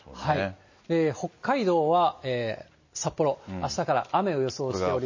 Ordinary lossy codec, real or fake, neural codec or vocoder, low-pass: MP3, 32 kbps; real; none; 7.2 kHz